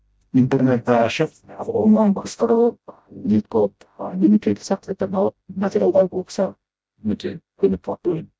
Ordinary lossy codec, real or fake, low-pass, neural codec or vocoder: none; fake; none; codec, 16 kHz, 0.5 kbps, FreqCodec, smaller model